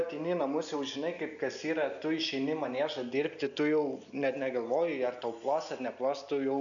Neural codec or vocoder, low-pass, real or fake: none; 7.2 kHz; real